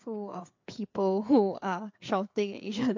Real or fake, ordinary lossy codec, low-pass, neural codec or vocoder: fake; MP3, 48 kbps; 7.2 kHz; codec, 16 kHz, 4 kbps, FreqCodec, larger model